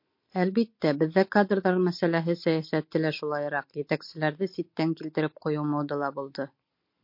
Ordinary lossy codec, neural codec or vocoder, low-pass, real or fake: MP3, 32 kbps; none; 5.4 kHz; real